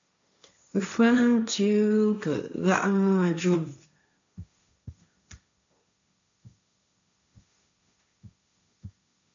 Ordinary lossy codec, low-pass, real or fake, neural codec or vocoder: MP3, 64 kbps; 7.2 kHz; fake; codec, 16 kHz, 1.1 kbps, Voila-Tokenizer